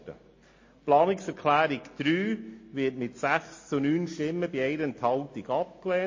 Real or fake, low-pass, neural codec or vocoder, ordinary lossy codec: real; 7.2 kHz; none; MP3, 32 kbps